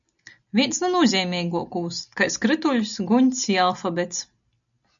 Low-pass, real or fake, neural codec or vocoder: 7.2 kHz; real; none